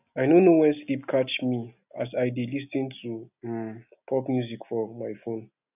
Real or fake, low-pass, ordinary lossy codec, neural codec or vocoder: real; 3.6 kHz; none; none